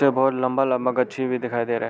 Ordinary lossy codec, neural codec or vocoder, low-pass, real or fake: none; none; none; real